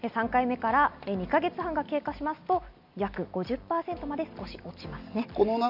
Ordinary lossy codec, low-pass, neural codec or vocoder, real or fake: none; 5.4 kHz; none; real